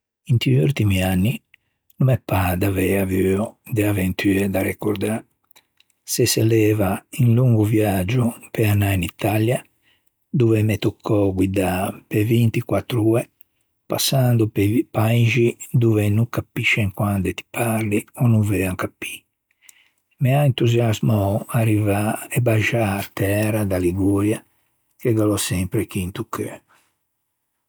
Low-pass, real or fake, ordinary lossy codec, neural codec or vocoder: none; real; none; none